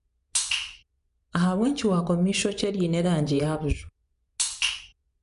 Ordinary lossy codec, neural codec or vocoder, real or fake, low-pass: none; vocoder, 24 kHz, 100 mel bands, Vocos; fake; 10.8 kHz